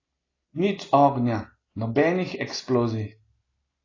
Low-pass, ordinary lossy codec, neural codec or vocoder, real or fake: 7.2 kHz; AAC, 32 kbps; none; real